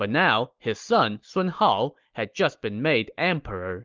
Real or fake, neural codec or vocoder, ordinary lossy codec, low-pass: real; none; Opus, 32 kbps; 7.2 kHz